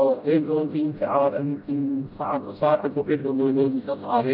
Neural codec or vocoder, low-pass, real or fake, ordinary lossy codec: codec, 16 kHz, 0.5 kbps, FreqCodec, smaller model; 5.4 kHz; fake; none